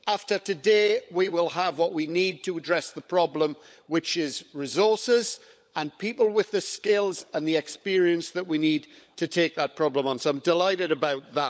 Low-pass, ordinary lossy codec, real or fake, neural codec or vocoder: none; none; fake; codec, 16 kHz, 16 kbps, FunCodec, trained on LibriTTS, 50 frames a second